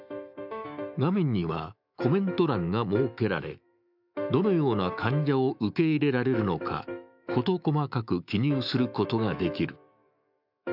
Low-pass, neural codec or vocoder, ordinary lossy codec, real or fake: 5.4 kHz; autoencoder, 48 kHz, 128 numbers a frame, DAC-VAE, trained on Japanese speech; none; fake